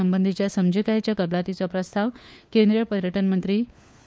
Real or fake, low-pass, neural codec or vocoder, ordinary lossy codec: fake; none; codec, 16 kHz, 4 kbps, FunCodec, trained on LibriTTS, 50 frames a second; none